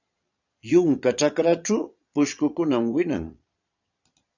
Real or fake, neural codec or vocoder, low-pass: fake; vocoder, 22.05 kHz, 80 mel bands, Vocos; 7.2 kHz